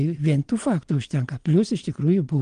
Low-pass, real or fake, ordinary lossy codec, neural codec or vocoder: 9.9 kHz; fake; Opus, 24 kbps; vocoder, 22.05 kHz, 80 mel bands, Vocos